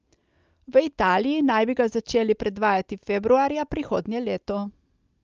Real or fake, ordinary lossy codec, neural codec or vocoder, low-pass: real; Opus, 24 kbps; none; 7.2 kHz